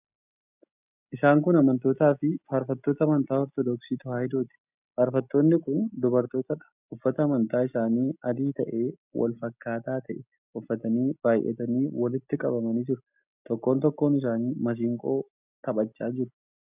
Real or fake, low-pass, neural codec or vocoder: real; 3.6 kHz; none